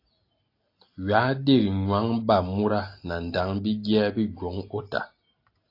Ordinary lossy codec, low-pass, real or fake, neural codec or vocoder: AAC, 32 kbps; 5.4 kHz; real; none